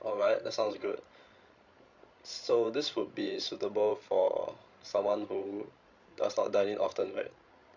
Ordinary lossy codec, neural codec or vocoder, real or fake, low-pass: Opus, 64 kbps; codec, 16 kHz, 16 kbps, FreqCodec, larger model; fake; 7.2 kHz